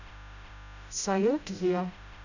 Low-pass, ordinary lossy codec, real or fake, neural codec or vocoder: 7.2 kHz; none; fake; codec, 16 kHz, 0.5 kbps, FreqCodec, smaller model